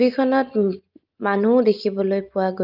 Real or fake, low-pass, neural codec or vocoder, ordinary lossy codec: real; 5.4 kHz; none; Opus, 24 kbps